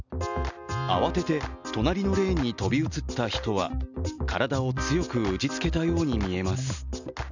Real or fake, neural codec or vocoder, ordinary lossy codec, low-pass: real; none; none; 7.2 kHz